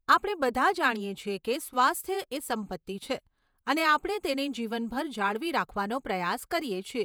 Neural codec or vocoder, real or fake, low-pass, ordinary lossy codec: vocoder, 48 kHz, 128 mel bands, Vocos; fake; none; none